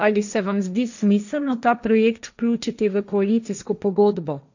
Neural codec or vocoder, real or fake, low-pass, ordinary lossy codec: codec, 16 kHz, 1.1 kbps, Voila-Tokenizer; fake; 7.2 kHz; none